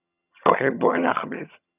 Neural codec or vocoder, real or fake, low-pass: vocoder, 22.05 kHz, 80 mel bands, HiFi-GAN; fake; 3.6 kHz